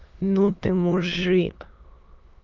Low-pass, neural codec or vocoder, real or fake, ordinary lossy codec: 7.2 kHz; autoencoder, 22.05 kHz, a latent of 192 numbers a frame, VITS, trained on many speakers; fake; Opus, 24 kbps